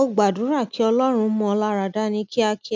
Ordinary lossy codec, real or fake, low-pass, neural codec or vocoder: none; real; none; none